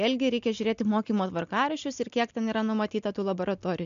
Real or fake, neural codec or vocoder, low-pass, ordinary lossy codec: real; none; 7.2 kHz; MP3, 64 kbps